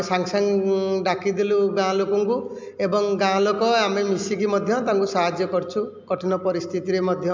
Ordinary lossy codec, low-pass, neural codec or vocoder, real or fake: MP3, 64 kbps; 7.2 kHz; none; real